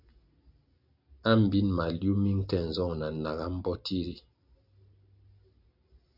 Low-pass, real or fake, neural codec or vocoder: 5.4 kHz; real; none